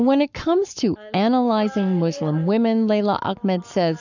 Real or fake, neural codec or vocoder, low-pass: fake; codec, 44.1 kHz, 7.8 kbps, Pupu-Codec; 7.2 kHz